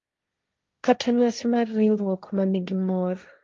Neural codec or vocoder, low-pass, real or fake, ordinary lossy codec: codec, 16 kHz, 1.1 kbps, Voila-Tokenizer; 7.2 kHz; fake; Opus, 32 kbps